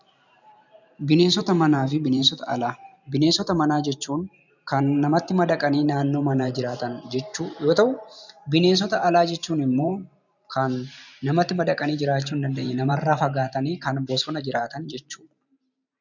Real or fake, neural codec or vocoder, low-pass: fake; vocoder, 44.1 kHz, 128 mel bands every 512 samples, BigVGAN v2; 7.2 kHz